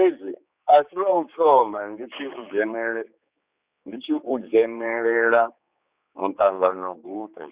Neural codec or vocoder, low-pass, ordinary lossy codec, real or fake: codec, 16 kHz, 4 kbps, X-Codec, HuBERT features, trained on general audio; 3.6 kHz; Opus, 64 kbps; fake